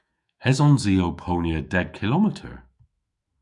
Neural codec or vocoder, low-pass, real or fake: autoencoder, 48 kHz, 128 numbers a frame, DAC-VAE, trained on Japanese speech; 10.8 kHz; fake